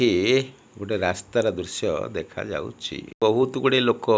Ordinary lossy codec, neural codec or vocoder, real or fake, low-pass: none; none; real; none